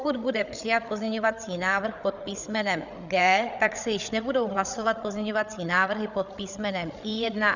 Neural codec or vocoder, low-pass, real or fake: codec, 16 kHz, 8 kbps, FreqCodec, larger model; 7.2 kHz; fake